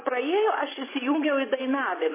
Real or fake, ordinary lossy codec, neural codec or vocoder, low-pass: fake; MP3, 16 kbps; vocoder, 24 kHz, 100 mel bands, Vocos; 3.6 kHz